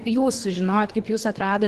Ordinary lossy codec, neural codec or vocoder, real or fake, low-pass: Opus, 16 kbps; codec, 24 kHz, 3 kbps, HILCodec; fake; 10.8 kHz